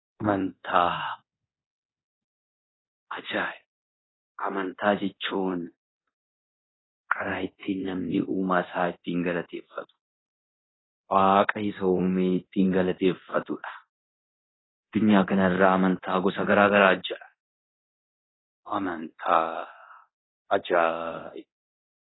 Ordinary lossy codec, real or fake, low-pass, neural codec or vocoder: AAC, 16 kbps; fake; 7.2 kHz; codec, 24 kHz, 0.9 kbps, DualCodec